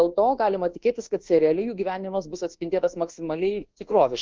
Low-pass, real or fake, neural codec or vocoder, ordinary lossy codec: 7.2 kHz; fake; codec, 24 kHz, 1.2 kbps, DualCodec; Opus, 16 kbps